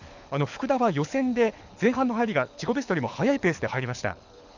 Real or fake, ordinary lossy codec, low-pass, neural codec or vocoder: fake; none; 7.2 kHz; codec, 24 kHz, 6 kbps, HILCodec